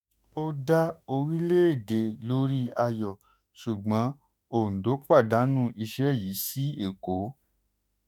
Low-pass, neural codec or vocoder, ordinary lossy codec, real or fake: none; autoencoder, 48 kHz, 32 numbers a frame, DAC-VAE, trained on Japanese speech; none; fake